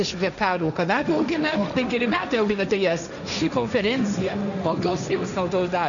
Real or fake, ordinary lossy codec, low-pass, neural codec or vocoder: fake; MP3, 96 kbps; 7.2 kHz; codec, 16 kHz, 1.1 kbps, Voila-Tokenizer